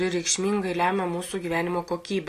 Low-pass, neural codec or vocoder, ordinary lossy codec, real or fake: 14.4 kHz; none; AAC, 48 kbps; real